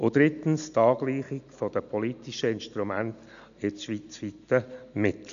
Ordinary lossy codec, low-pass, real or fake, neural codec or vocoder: MP3, 96 kbps; 7.2 kHz; real; none